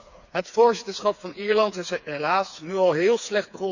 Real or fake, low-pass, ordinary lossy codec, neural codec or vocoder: fake; 7.2 kHz; none; codec, 16 kHz, 4 kbps, FreqCodec, smaller model